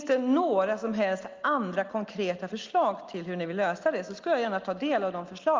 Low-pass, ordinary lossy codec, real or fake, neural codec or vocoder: 7.2 kHz; Opus, 32 kbps; real; none